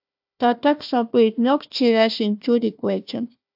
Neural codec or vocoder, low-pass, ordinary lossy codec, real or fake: codec, 16 kHz, 1 kbps, FunCodec, trained on Chinese and English, 50 frames a second; 5.4 kHz; AAC, 48 kbps; fake